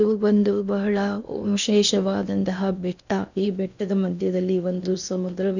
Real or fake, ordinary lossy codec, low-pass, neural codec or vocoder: fake; none; 7.2 kHz; codec, 16 kHz in and 24 kHz out, 0.8 kbps, FocalCodec, streaming, 65536 codes